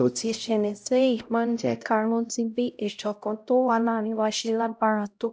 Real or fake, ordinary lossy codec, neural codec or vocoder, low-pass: fake; none; codec, 16 kHz, 0.5 kbps, X-Codec, HuBERT features, trained on LibriSpeech; none